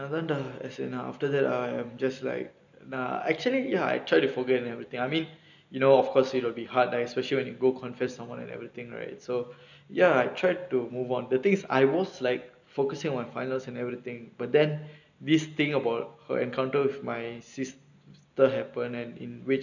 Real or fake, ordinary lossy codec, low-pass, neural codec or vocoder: real; none; 7.2 kHz; none